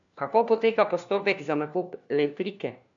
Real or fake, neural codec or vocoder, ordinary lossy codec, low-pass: fake; codec, 16 kHz, 1 kbps, FunCodec, trained on LibriTTS, 50 frames a second; MP3, 64 kbps; 7.2 kHz